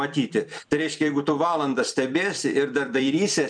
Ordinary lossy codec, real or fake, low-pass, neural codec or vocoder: MP3, 96 kbps; fake; 9.9 kHz; vocoder, 44.1 kHz, 128 mel bands every 256 samples, BigVGAN v2